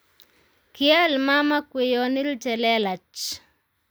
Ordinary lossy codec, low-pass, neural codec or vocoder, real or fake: none; none; none; real